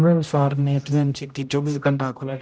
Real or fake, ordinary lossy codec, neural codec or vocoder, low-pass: fake; none; codec, 16 kHz, 0.5 kbps, X-Codec, HuBERT features, trained on general audio; none